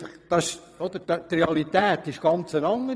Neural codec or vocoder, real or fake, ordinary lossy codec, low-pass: vocoder, 22.05 kHz, 80 mel bands, WaveNeXt; fake; none; none